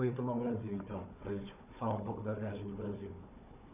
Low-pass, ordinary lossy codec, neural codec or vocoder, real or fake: 3.6 kHz; none; codec, 16 kHz, 4 kbps, FunCodec, trained on Chinese and English, 50 frames a second; fake